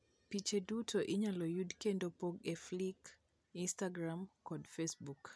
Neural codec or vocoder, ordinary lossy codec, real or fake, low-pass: none; none; real; none